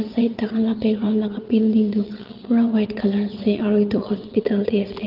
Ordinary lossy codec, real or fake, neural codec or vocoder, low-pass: Opus, 32 kbps; fake; vocoder, 22.05 kHz, 80 mel bands, WaveNeXt; 5.4 kHz